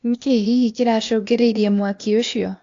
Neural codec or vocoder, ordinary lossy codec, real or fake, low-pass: codec, 16 kHz, 0.8 kbps, ZipCodec; AAC, 48 kbps; fake; 7.2 kHz